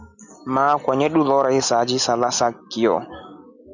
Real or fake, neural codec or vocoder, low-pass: real; none; 7.2 kHz